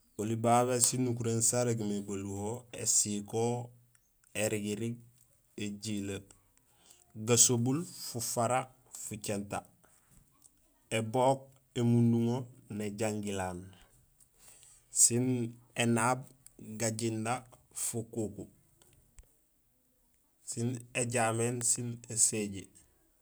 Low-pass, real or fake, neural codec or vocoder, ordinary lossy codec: none; real; none; none